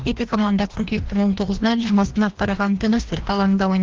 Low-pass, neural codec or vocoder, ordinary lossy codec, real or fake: 7.2 kHz; codec, 16 kHz, 1 kbps, FreqCodec, larger model; Opus, 16 kbps; fake